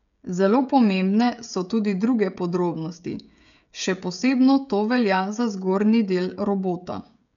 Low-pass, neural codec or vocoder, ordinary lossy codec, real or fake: 7.2 kHz; codec, 16 kHz, 16 kbps, FreqCodec, smaller model; none; fake